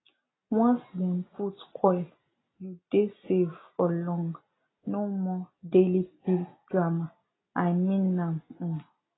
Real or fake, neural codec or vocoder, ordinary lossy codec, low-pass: real; none; AAC, 16 kbps; 7.2 kHz